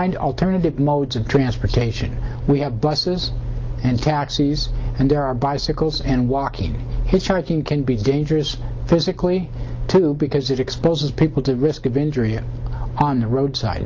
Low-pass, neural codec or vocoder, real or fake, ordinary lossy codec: 7.2 kHz; none; real; Opus, 24 kbps